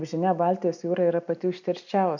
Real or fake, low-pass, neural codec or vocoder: real; 7.2 kHz; none